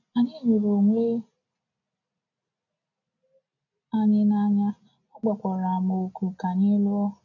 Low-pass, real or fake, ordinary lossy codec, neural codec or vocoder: 7.2 kHz; real; none; none